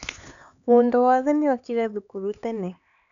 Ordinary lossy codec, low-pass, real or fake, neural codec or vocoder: none; 7.2 kHz; fake; codec, 16 kHz, 4 kbps, X-Codec, HuBERT features, trained on LibriSpeech